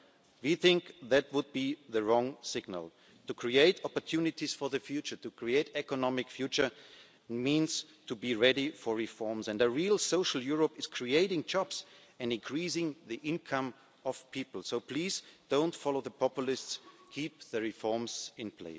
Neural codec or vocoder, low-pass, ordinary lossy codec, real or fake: none; none; none; real